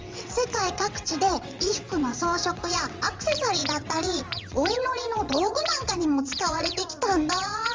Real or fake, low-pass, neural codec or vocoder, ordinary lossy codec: fake; 7.2 kHz; vocoder, 22.05 kHz, 80 mel bands, WaveNeXt; Opus, 32 kbps